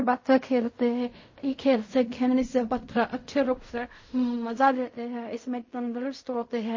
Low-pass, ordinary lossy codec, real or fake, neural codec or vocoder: 7.2 kHz; MP3, 32 kbps; fake; codec, 16 kHz in and 24 kHz out, 0.4 kbps, LongCat-Audio-Codec, fine tuned four codebook decoder